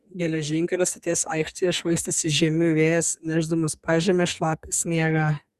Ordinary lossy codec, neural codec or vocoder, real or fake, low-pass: Opus, 64 kbps; codec, 44.1 kHz, 2.6 kbps, SNAC; fake; 14.4 kHz